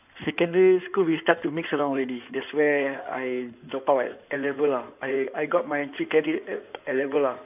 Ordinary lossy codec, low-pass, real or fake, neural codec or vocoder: none; 3.6 kHz; fake; codec, 16 kHz in and 24 kHz out, 2.2 kbps, FireRedTTS-2 codec